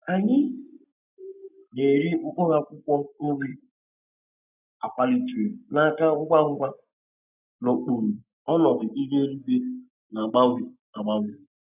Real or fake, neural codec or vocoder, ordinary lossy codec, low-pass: real; none; none; 3.6 kHz